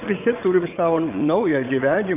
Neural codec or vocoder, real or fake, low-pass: vocoder, 22.05 kHz, 80 mel bands, WaveNeXt; fake; 3.6 kHz